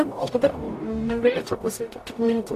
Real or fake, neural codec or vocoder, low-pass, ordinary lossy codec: fake; codec, 44.1 kHz, 0.9 kbps, DAC; 14.4 kHz; AAC, 64 kbps